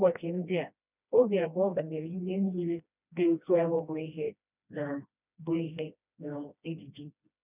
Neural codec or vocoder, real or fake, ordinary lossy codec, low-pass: codec, 16 kHz, 1 kbps, FreqCodec, smaller model; fake; none; 3.6 kHz